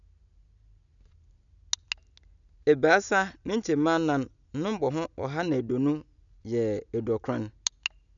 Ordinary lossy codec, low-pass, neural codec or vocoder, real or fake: none; 7.2 kHz; none; real